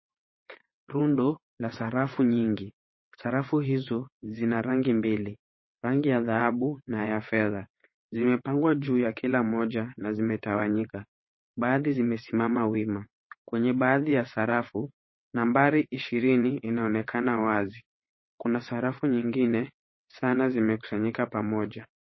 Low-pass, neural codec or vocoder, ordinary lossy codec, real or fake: 7.2 kHz; vocoder, 22.05 kHz, 80 mel bands, WaveNeXt; MP3, 24 kbps; fake